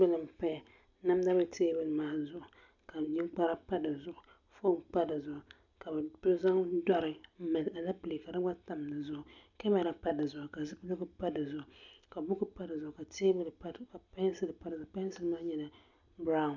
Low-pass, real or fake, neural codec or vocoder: 7.2 kHz; real; none